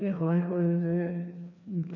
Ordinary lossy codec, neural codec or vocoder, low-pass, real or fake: none; codec, 16 kHz, 2 kbps, FreqCodec, larger model; 7.2 kHz; fake